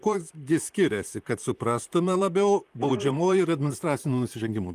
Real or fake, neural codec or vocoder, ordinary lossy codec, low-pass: fake; vocoder, 44.1 kHz, 128 mel bands, Pupu-Vocoder; Opus, 32 kbps; 14.4 kHz